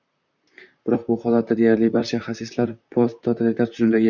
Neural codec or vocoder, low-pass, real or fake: vocoder, 44.1 kHz, 128 mel bands, Pupu-Vocoder; 7.2 kHz; fake